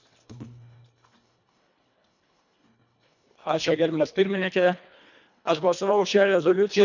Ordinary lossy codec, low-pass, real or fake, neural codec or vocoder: none; 7.2 kHz; fake; codec, 24 kHz, 1.5 kbps, HILCodec